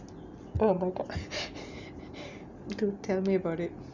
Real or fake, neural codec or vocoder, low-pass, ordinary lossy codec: real; none; 7.2 kHz; none